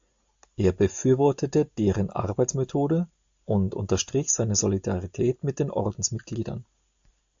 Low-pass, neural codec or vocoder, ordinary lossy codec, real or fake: 7.2 kHz; none; AAC, 64 kbps; real